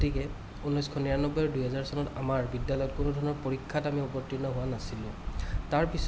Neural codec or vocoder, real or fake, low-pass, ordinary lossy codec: none; real; none; none